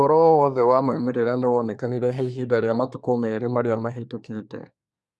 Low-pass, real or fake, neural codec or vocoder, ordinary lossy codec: none; fake; codec, 24 kHz, 1 kbps, SNAC; none